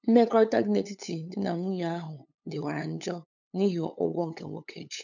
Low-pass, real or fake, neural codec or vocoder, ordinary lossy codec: 7.2 kHz; fake; codec, 16 kHz, 8 kbps, FunCodec, trained on LibriTTS, 25 frames a second; none